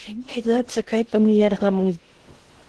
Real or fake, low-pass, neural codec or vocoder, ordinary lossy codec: fake; 10.8 kHz; codec, 16 kHz in and 24 kHz out, 0.6 kbps, FocalCodec, streaming, 2048 codes; Opus, 16 kbps